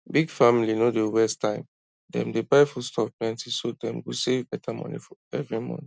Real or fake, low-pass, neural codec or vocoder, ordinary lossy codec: real; none; none; none